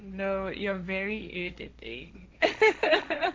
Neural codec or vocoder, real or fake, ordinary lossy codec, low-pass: codec, 16 kHz, 1.1 kbps, Voila-Tokenizer; fake; none; none